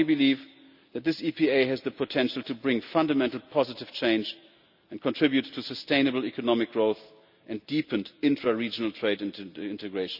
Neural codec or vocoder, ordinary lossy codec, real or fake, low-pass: none; none; real; 5.4 kHz